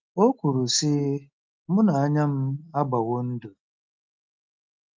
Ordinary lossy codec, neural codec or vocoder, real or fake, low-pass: Opus, 32 kbps; none; real; 7.2 kHz